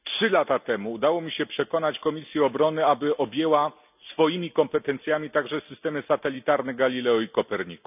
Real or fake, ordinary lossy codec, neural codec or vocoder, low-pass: real; none; none; 3.6 kHz